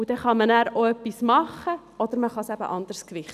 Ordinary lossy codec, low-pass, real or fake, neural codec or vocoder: none; 14.4 kHz; real; none